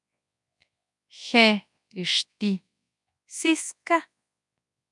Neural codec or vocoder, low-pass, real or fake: codec, 24 kHz, 0.9 kbps, WavTokenizer, large speech release; 10.8 kHz; fake